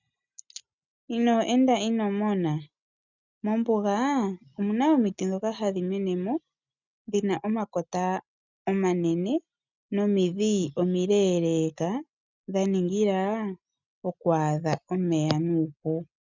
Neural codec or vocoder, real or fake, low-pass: none; real; 7.2 kHz